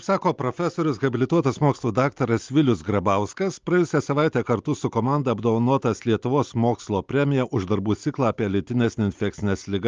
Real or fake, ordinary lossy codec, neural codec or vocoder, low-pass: real; Opus, 32 kbps; none; 7.2 kHz